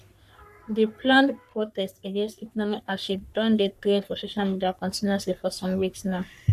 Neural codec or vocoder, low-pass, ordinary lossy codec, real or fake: codec, 44.1 kHz, 3.4 kbps, Pupu-Codec; 14.4 kHz; none; fake